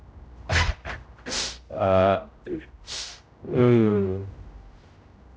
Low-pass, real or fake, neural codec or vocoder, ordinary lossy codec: none; fake; codec, 16 kHz, 0.5 kbps, X-Codec, HuBERT features, trained on general audio; none